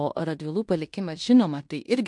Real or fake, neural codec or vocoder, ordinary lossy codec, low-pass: fake; codec, 16 kHz in and 24 kHz out, 0.9 kbps, LongCat-Audio-Codec, fine tuned four codebook decoder; MP3, 64 kbps; 10.8 kHz